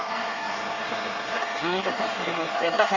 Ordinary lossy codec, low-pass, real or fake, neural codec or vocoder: Opus, 32 kbps; 7.2 kHz; fake; codec, 24 kHz, 1 kbps, SNAC